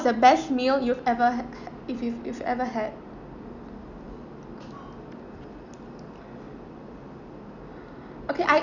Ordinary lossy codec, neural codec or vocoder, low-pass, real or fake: none; none; 7.2 kHz; real